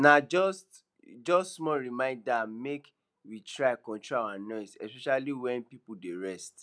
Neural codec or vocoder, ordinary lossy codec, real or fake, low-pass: none; none; real; none